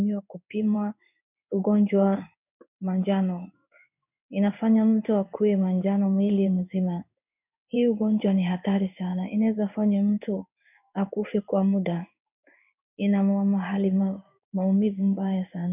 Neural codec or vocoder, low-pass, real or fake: codec, 16 kHz in and 24 kHz out, 1 kbps, XY-Tokenizer; 3.6 kHz; fake